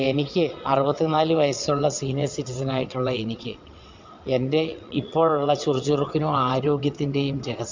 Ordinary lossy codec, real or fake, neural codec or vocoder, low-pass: MP3, 64 kbps; fake; vocoder, 22.05 kHz, 80 mel bands, WaveNeXt; 7.2 kHz